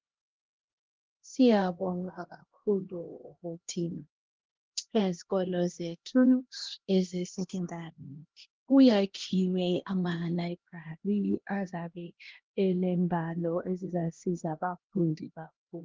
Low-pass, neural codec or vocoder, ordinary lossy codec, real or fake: 7.2 kHz; codec, 16 kHz, 1 kbps, X-Codec, HuBERT features, trained on LibriSpeech; Opus, 16 kbps; fake